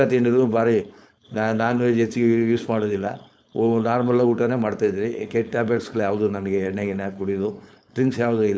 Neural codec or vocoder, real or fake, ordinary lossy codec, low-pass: codec, 16 kHz, 4.8 kbps, FACodec; fake; none; none